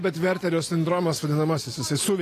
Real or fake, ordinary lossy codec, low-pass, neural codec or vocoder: real; AAC, 48 kbps; 14.4 kHz; none